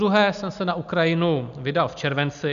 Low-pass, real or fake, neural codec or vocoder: 7.2 kHz; real; none